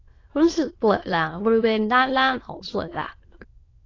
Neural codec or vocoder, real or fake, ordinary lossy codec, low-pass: autoencoder, 22.05 kHz, a latent of 192 numbers a frame, VITS, trained on many speakers; fake; AAC, 32 kbps; 7.2 kHz